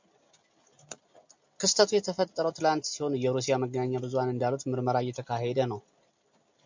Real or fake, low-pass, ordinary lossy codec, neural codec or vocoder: real; 7.2 kHz; MP3, 64 kbps; none